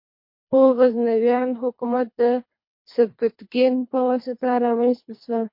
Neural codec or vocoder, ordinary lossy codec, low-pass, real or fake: codec, 24 kHz, 3 kbps, HILCodec; MP3, 32 kbps; 5.4 kHz; fake